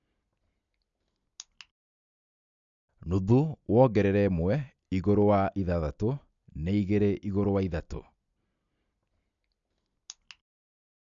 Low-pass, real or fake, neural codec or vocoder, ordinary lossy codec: 7.2 kHz; real; none; MP3, 96 kbps